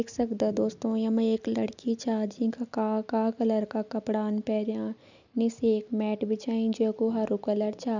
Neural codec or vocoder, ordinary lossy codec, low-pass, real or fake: none; none; 7.2 kHz; real